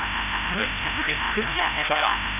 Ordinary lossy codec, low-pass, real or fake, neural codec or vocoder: none; 3.6 kHz; fake; codec, 16 kHz, 0.5 kbps, FreqCodec, larger model